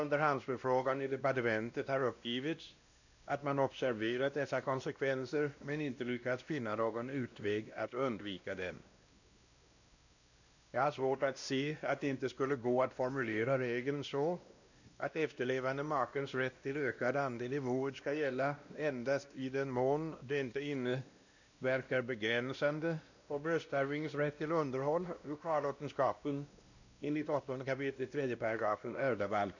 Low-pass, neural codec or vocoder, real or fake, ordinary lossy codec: 7.2 kHz; codec, 16 kHz, 1 kbps, X-Codec, WavLM features, trained on Multilingual LibriSpeech; fake; none